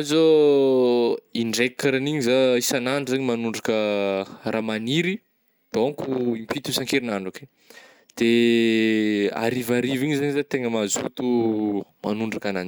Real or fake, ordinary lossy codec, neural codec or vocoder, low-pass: real; none; none; none